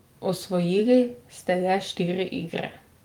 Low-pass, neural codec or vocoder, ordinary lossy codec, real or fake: 19.8 kHz; vocoder, 44.1 kHz, 128 mel bands every 512 samples, BigVGAN v2; Opus, 32 kbps; fake